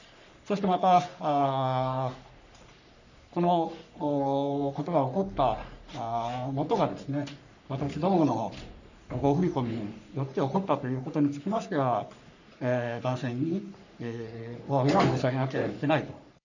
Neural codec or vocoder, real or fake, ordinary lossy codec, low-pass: codec, 44.1 kHz, 3.4 kbps, Pupu-Codec; fake; none; 7.2 kHz